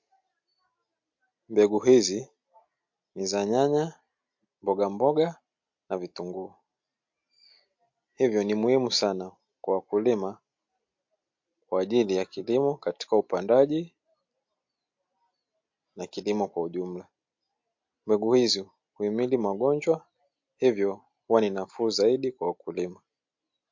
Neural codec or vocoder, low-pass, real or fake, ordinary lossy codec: none; 7.2 kHz; real; MP3, 48 kbps